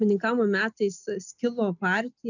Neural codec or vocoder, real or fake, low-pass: none; real; 7.2 kHz